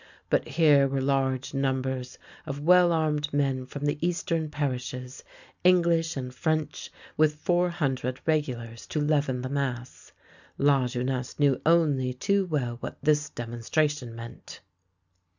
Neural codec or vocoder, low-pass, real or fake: none; 7.2 kHz; real